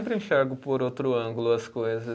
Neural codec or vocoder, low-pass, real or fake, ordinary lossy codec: none; none; real; none